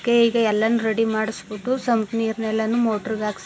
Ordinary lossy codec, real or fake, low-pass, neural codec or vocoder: none; real; none; none